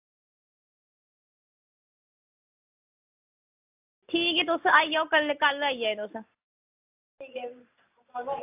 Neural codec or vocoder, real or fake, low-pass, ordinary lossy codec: none; real; 3.6 kHz; AAC, 32 kbps